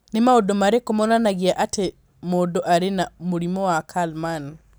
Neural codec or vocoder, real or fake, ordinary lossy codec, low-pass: none; real; none; none